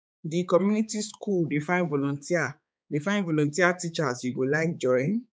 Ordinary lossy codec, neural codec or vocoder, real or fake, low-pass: none; codec, 16 kHz, 4 kbps, X-Codec, HuBERT features, trained on balanced general audio; fake; none